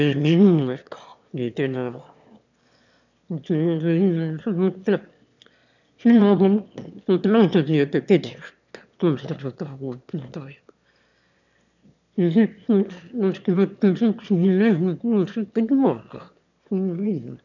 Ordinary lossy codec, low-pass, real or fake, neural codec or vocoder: none; 7.2 kHz; fake; autoencoder, 22.05 kHz, a latent of 192 numbers a frame, VITS, trained on one speaker